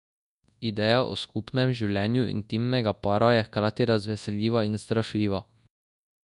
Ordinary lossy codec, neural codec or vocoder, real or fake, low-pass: none; codec, 24 kHz, 0.9 kbps, WavTokenizer, large speech release; fake; 10.8 kHz